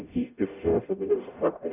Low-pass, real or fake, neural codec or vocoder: 3.6 kHz; fake; codec, 44.1 kHz, 0.9 kbps, DAC